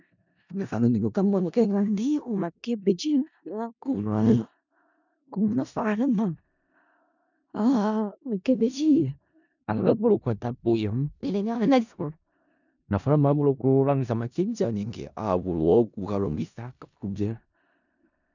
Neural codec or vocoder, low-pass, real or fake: codec, 16 kHz in and 24 kHz out, 0.4 kbps, LongCat-Audio-Codec, four codebook decoder; 7.2 kHz; fake